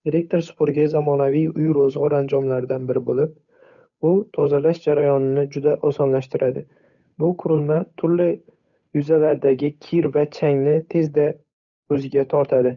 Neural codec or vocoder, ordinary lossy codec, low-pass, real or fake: codec, 16 kHz, 8 kbps, FunCodec, trained on Chinese and English, 25 frames a second; none; 7.2 kHz; fake